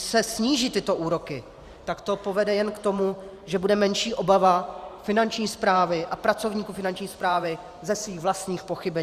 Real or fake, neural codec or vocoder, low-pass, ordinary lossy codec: fake; vocoder, 44.1 kHz, 128 mel bands every 512 samples, BigVGAN v2; 14.4 kHz; Opus, 64 kbps